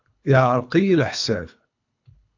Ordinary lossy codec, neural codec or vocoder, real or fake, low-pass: AAC, 48 kbps; codec, 24 kHz, 3 kbps, HILCodec; fake; 7.2 kHz